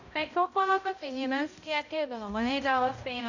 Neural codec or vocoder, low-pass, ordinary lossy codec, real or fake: codec, 16 kHz, 0.5 kbps, X-Codec, HuBERT features, trained on balanced general audio; 7.2 kHz; none; fake